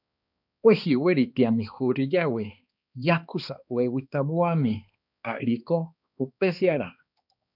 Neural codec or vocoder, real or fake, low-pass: codec, 16 kHz, 2 kbps, X-Codec, HuBERT features, trained on balanced general audio; fake; 5.4 kHz